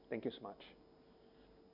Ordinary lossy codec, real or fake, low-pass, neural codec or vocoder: none; real; 5.4 kHz; none